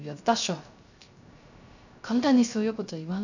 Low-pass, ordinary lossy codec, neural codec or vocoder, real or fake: 7.2 kHz; none; codec, 16 kHz, 0.3 kbps, FocalCodec; fake